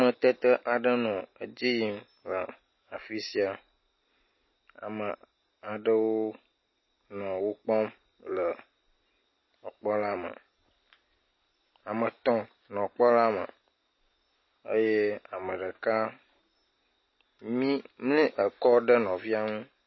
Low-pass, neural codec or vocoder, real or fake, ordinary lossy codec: 7.2 kHz; none; real; MP3, 24 kbps